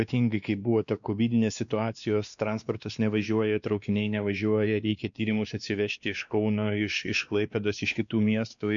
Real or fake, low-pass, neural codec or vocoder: fake; 7.2 kHz; codec, 16 kHz, 2 kbps, X-Codec, WavLM features, trained on Multilingual LibriSpeech